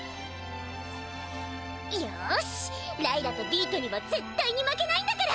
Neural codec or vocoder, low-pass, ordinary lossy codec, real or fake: none; none; none; real